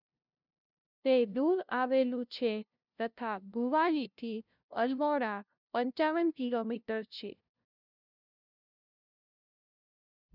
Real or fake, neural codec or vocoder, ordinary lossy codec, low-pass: fake; codec, 16 kHz, 0.5 kbps, FunCodec, trained on LibriTTS, 25 frames a second; none; 5.4 kHz